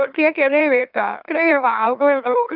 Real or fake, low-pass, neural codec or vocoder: fake; 5.4 kHz; autoencoder, 44.1 kHz, a latent of 192 numbers a frame, MeloTTS